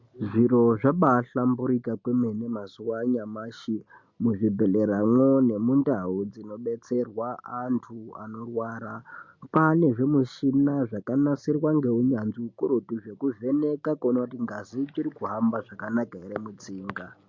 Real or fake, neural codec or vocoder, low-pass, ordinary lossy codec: real; none; 7.2 kHz; MP3, 48 kbps